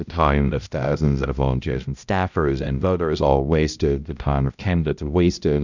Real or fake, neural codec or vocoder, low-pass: fake; codec, 16 kHz, 0.5 kbps, X-Codec, HuBERT features, trained on balanced general audio; 7.2 kHz